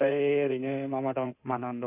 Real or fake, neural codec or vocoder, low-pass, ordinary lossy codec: fake; codec, 16 kHz in and 24 kHz out, 2.2 kbps, FireRedTTS-2 codec; 3.6 kHz; none